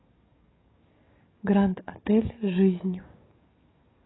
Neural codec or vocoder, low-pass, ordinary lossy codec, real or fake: codec, 44.1 kHz, 7.8 kbps, DAC; 7.2 kHz; AAC, 16 kbps; fake